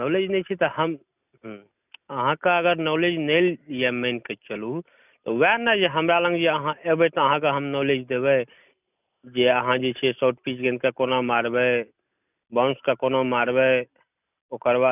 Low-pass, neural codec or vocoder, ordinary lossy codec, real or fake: 3.6 kHz; none; none; real